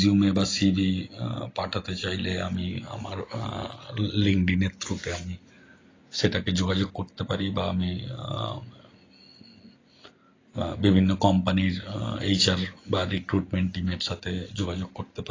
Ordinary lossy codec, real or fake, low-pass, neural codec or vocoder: AAC, 32 kbps; real; 7.2 kHz; none